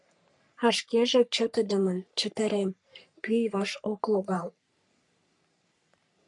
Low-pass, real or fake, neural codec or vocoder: 10.8 kHz; fake; codec, 44.1 kHz, 3.4 kbps, Pupu-Codec